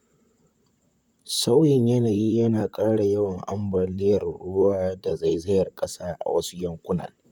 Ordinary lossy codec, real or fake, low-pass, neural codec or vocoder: none; fake; 19.8 kHz; vocoder, 44.1 kHz, 128 mel bands, Pupu-Vocoder